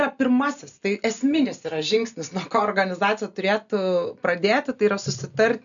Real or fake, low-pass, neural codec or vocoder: real; 7.2 kHz; none